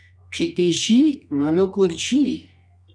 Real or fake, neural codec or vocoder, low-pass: fake; codec, 24 kHz, 0.9 kbps, WavTokenizer, medium music audio release; 9.9 kHz